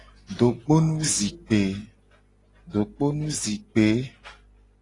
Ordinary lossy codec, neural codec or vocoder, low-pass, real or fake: AAC, 32 kbps; none; 10.8 kHz; real